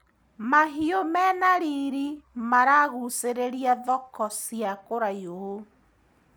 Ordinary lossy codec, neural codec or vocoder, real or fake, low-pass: none; none; real; none